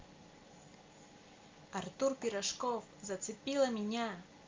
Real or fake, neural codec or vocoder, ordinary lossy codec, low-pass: real; none; Opus, 24 kbps; 7.2 kHz